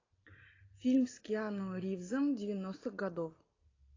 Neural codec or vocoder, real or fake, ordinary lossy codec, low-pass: none; real; AAC, 32 kbps; 7.2 kHz